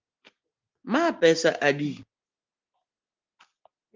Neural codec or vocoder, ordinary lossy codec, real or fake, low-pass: none; Opus, 24 kbps; real; 7.2 kHz